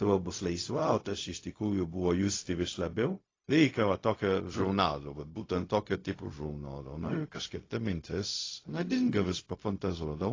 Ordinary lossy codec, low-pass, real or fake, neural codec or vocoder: AAC, 32 kbps; 7.2 kHz; fake; codec, 16 kHz, 0.4 kbps, LongCat-Audio-Codec